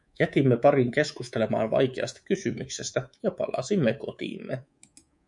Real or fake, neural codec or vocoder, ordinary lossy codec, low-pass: fake; codec, 24 kHz, 3.1 kbps, DualCodec; MP3, 96 kbps; 10.8 kHz